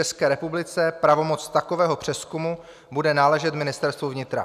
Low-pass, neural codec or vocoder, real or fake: 14.4 kHz; none; real